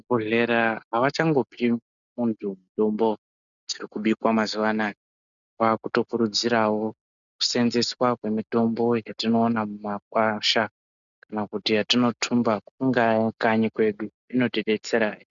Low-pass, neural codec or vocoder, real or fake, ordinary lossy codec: 7.2 kHz; none; real; MP3, 96 kbps